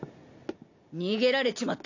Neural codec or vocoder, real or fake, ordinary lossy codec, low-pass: none; real; AAC, 48 kbps; 7.2 kHz